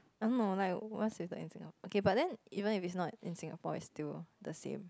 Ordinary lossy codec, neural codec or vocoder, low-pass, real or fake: none; none; none; real